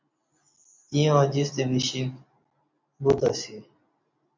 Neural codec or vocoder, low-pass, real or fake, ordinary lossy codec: none; 7.2 kHz; real; MP3, 64 kbps